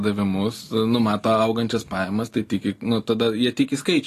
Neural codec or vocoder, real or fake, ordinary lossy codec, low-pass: none; real; AAC, 48 kbps; 14.4 kHz